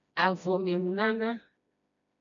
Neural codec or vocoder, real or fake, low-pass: codec, 16 kHz, 1 kbps, FreqCodec, smaller model; fake; 7.2 kHz